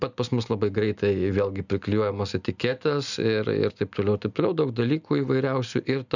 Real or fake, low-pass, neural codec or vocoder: real; 7.2 kHz; none